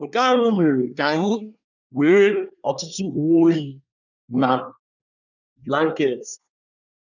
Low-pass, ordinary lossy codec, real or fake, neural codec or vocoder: 7.2 kHz; none; fake; codec, 24 kHz, 1 kbps, SNAC